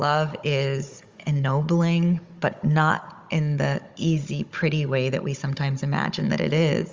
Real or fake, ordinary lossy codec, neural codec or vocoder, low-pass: fake; Opus, 32 kbps; codec, 16 kHz, 16 kbps, FunCodec, trained on Chinese and English, 50 frames a second; 7.2 kHz